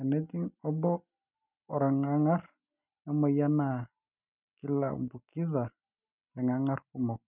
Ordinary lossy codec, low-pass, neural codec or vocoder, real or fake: none; 3.6 kHz; none; real